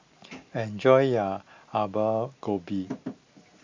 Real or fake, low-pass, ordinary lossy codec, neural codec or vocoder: real; 7.2 kHz; MP3, 48 kbps; none